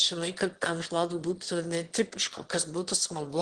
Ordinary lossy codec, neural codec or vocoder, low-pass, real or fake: Opus, 16 kbps; autoencoder, 22.05 kHz, a latent of 192 numbers a frame, VITS, trained on one speaker; 9.9 kHz; fake